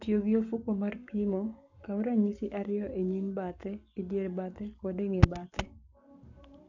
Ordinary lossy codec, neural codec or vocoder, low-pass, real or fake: none; codec, 44.1 kHz, 7.8 kbps, Pupu-Codec; 7.2 kHz; fake